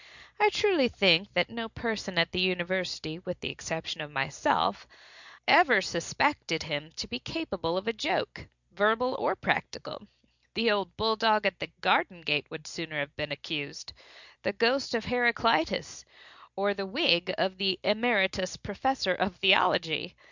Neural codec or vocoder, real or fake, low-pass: none; real; 7.2 kHz